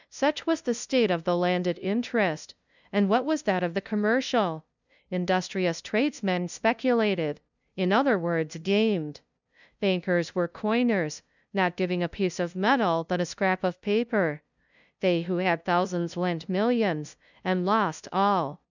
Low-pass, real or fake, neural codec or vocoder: 7.2 kHz; fake; codec, 16 kHz, 0.5 kbps, FunCodec, trained on LibriTTS, 25 frames a second